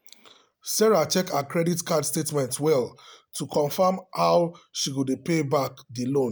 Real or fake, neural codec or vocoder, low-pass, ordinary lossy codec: real; none; none; none